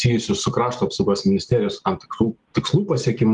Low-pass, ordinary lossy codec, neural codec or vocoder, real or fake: 10.8 kHz; Opus, 24 kbps; none; real